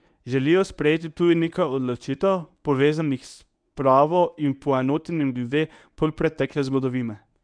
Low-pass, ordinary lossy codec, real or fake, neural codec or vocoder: 9.9 kHz; none; fake; codec, 24 kHz, 0.9 kbps, WavTokenizer, medium speech release version 1